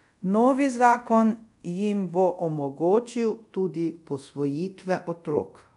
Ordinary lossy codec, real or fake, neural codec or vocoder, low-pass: none; fake; codec, 24 kHz, 0.5 kbps, DualCodec; 10.8 kHz